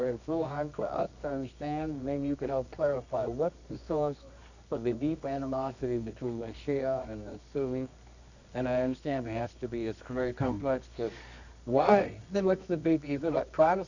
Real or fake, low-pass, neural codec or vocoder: fake; 7.2 kHz; codec, 24 kHz, 0.9 kbps, WavTokenizer, medium music audio release